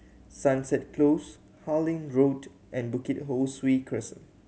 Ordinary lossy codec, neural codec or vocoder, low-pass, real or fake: none; none; none; real